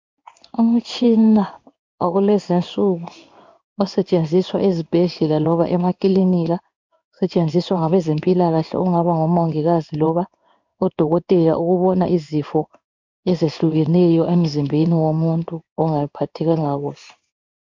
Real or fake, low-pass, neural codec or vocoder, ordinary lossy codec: fake; 7.2 kHz; codec, 16 kHz in and 24 kHz out, 1 kbps, XY-Tokenizer; MP3, 64 kbps